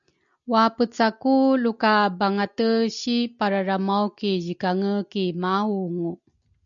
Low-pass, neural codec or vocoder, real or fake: 7.2 kHz; none; real